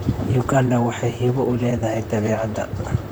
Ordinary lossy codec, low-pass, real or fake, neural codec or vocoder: none; none; fake; vocoder, 44.1 kHz, 128 mel bands, Pupu-Vocoder